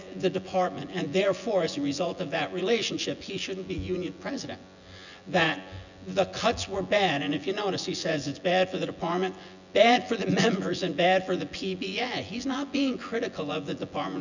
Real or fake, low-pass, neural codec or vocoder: fake; 7.2 kHz; vocoder, 24 kHz, 100 mel bands, Vocos